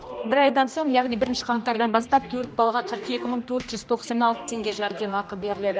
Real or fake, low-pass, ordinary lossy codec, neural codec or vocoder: fake; none; none; codec, 16 kHz, 1 kbps, X-Codec, HuBERT features, trained on general audio